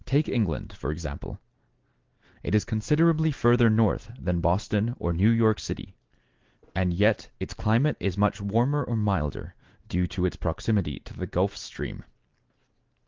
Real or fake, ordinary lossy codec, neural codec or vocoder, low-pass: real; Opus, 16 kbps; none; 7.2 kHz